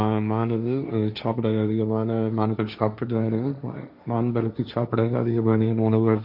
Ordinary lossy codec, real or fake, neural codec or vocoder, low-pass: none; fake; codec, 16 kHz, 1.1 kbps, Voila-Tokenizer; 5.4 kHz